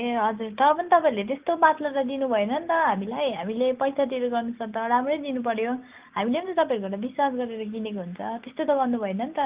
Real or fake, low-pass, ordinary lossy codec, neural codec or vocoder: real; 3.6 kHz; Opus, 24 kbps; none